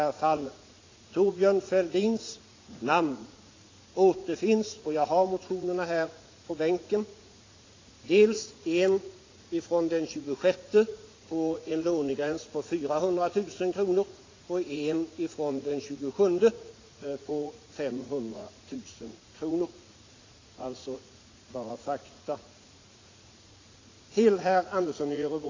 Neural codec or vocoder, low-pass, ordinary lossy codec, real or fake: vocoder, 44.1 kHz, 80 mel bands, Vocos; 7.2 kHz; AAC, 32 kbps; fake